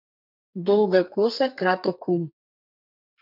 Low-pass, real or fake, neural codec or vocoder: 5.4 kHz; fake; codec, 32 kHz, 1.9 kbps, SNAC